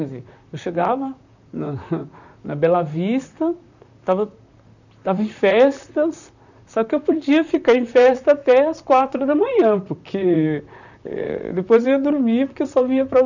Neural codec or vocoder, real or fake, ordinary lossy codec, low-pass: vocoder, 44.1 kHz, 128 mel bands, Pupu-Vocoder; fake; none; 7.2 kHz